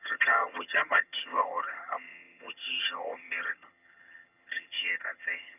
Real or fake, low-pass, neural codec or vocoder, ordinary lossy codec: fake; 3.6 kHz; vocoder, 22.05 kHz, 80 mel bands, HiFi-GAN; none